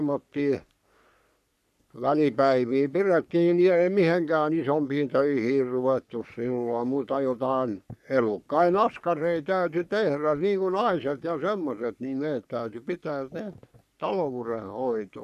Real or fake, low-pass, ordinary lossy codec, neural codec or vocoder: fake; 14.4 kHz; none; codec, 44.1 kHz, 3.4 kbps, Pupu-Codec